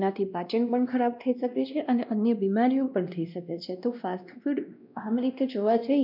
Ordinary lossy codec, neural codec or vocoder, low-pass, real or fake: none; codec, 16 kHz, 1 kbps, X-Codec, WavLM features, trained on Multilingual LibriSpeech; 5.4 kHz; fake